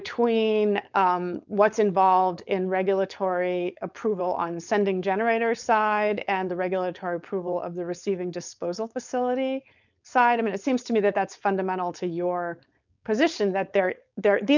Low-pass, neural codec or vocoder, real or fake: 7.2 kHz; codec, 16 kHz, 4.8 kbps, FACodec; fake